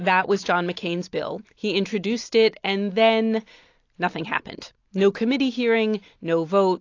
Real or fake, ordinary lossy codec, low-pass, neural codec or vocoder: real; AAC, 48 kbps; 7.2 kHz; none